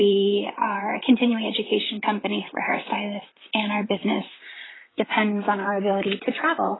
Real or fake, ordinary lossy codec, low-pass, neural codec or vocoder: fake; AAC, 16 kbps; 7.2 kHz; vocoder, 44.1 kHz, 128 mel bands, Pupu-Vocoder